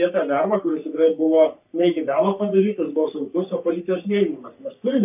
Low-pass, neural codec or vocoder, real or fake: 3.6 kHz; codec, 44.1 kHz, 3.4 kbps, Pupu-Codec; fake